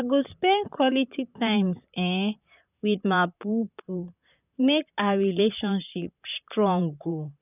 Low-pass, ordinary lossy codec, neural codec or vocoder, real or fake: 3.6 kHz; none; vocoder, 44.1 kHz, 128 mel bands, Pupu-Vocoder; fake